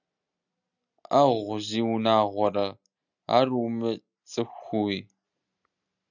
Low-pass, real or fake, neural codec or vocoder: 7.2 kHz; real; none